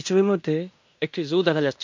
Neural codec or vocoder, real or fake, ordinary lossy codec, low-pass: codec, 16 kHz in and 24 kHz out, 0.9 kbps, LongCat-Audio-Codec, fine tuned four codebook decoder; fake; MP3, 48 kbps; 7.2 kHz